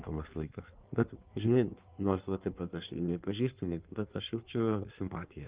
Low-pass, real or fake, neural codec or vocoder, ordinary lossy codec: 3.6 kHz; fake; codec, 16 kHz in and 24 kHz out, 1.1 kbps, FireRedTTS-2 codec; Opus, 32 kbps